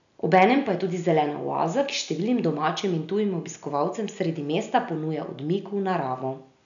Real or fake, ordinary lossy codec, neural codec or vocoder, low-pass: real; none; none; 7.2 kHz